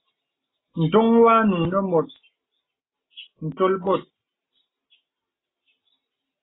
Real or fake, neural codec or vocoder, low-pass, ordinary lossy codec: real; none; 7.2 kHz; AAC, 16 kbps